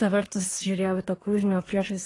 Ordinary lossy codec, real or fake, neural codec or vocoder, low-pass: AAC, 32 kbps; fake; codec, 24 kHz, 1 kbps, SNAC; 10.8 kHz